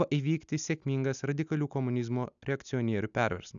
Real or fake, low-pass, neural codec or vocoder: real; 7.2 kHz; none